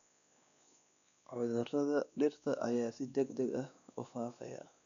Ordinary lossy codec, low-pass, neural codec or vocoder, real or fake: none; 7.2 kHz; codec, 16 kHz, 2 kbps, X-Codec, WavLM features, trained on Multilingual LibriSpeech; fake